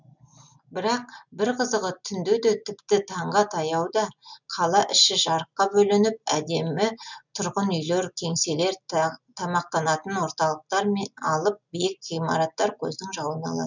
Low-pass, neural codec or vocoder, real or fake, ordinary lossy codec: 7.2 kHz; none; real; none